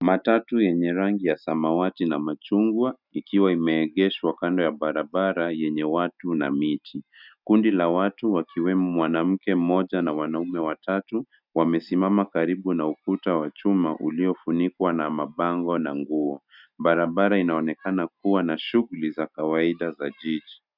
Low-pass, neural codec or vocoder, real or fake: 5.4 kHz; none; real